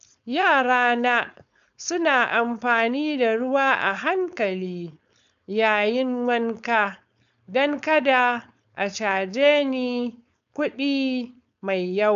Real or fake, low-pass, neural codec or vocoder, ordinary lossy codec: fake; 7.2 kHz; codec, 16 kHz, 4.8 kbps, FACodec; none